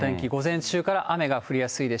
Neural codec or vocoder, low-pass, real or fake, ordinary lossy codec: none; none; real; none